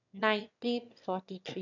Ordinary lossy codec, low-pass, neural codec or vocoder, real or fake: none; 7.2 kHz; autoencoder, 22.05 kHz, a latent of 192 numbers a frame, VITS, trained on one speaker; fake